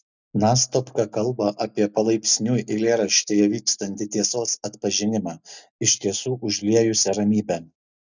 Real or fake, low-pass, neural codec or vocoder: real; 7.2 kHz; none